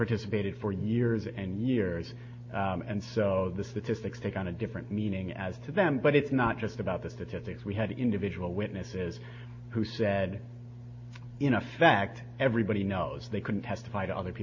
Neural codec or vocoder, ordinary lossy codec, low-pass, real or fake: none; MP3, 32 kbps; 7.2 kHz; real